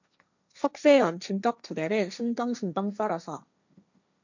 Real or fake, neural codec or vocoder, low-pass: fake; codec, 16 kHz, 1.1 kbps, Voila-Tokenizer; 7.2 kHz